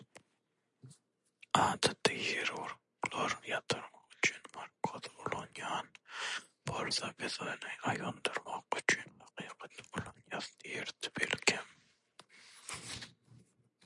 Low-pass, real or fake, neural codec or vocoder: 10.8 kHz; real; none